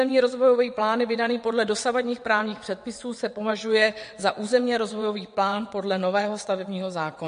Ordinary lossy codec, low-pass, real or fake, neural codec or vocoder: MP3, 48 kbps; 9.9 kHz; fake; vocoder, 22.05 kHz, 80 mel bands, WaveNeXt